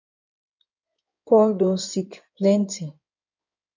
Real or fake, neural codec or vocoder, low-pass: fake; codec, 16 kHz in and 24 kHz out, 2.2 kbps, FireRedTTS-2 codec; 7.2 kHz